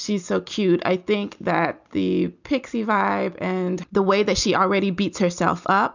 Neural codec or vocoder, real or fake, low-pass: none; real; 7.2 kHz